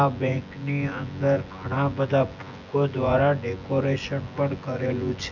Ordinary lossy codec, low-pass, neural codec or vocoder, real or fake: none; 7.2 kHz; vocoder, 24 kHz, 100 mel bands, Vocos; fake